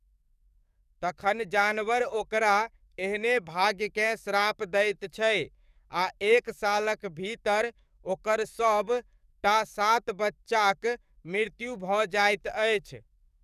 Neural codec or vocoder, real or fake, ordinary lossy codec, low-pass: codec, 44.1 kHz, 7.8 kbps, DAC; fake; none; 14.4 kHz